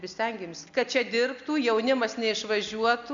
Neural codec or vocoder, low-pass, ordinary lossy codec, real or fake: none; 7.2 kHz; MP3, 64 kbps; real